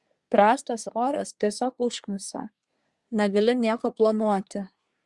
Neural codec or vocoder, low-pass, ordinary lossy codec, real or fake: codec, 24 kHz, 1 kbps, SNAC; 10.8 kHz; Opus, 64 kbps; fake